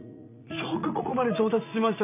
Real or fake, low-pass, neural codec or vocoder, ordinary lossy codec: fake; 3.6 kHz; vocoder, 44.1 kHz, 80 mel bands, Vocos; none